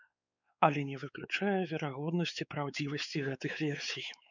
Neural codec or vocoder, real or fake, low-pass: codec, 16 kHz, 4 kbps, X-Codec, WavLM features, trained on Multilingual LibriSpeech; fake; 7.2 kHz